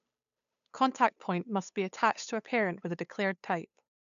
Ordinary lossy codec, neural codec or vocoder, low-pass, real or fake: none; codec, 16 kHz, 8 kbps, FunCodec, trained on Chinese and English, 25 frames a second; 7.2 kHz; fake